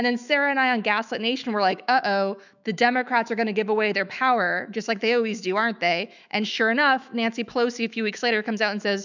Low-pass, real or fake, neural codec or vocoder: 7.2 kHz; fake; autoencoder, 48 kHz, 128 numbers a frame, DAC-VAE, trained on Japanese speech